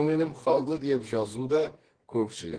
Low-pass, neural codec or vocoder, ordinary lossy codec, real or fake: 9.9 kHz; codec, 24 kHz, 0.9 kbps, WavTokenizer, medium music audio release; Opus, 16 kbps; fake